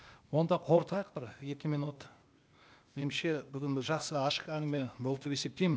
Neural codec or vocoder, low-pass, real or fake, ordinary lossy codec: codec, 16 kHz, 0.8 kbps, ZipCodec; none; fake; none